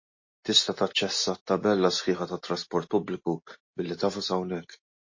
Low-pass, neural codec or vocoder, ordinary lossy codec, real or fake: 7.2 kHz; none; MP3, 32 kbps; real